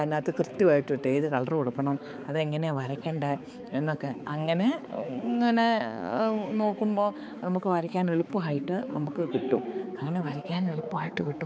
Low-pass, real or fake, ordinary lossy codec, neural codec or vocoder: none; fake; none; codec, 16 kHz, 4 kbps, X-Codec, HuBERT features, trained on balanced general audio